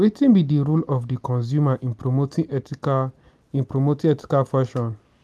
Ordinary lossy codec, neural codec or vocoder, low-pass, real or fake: none; none; none; real